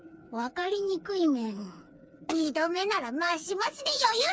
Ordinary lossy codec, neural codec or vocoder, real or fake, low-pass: none; codec, 16 kHz, 4 kbps, FreqCodec, smaller model; fake; none